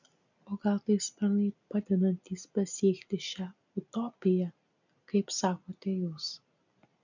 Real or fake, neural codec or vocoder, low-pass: real; none; 7.2 kHz